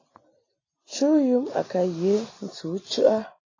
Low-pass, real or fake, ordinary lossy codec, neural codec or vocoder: 7.2 kHz; real; AAC, 32 kbps; none